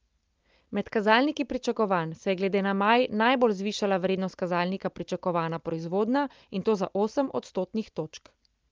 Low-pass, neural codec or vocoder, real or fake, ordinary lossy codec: 7.2 kHz; none; real; Opus, 24 kbps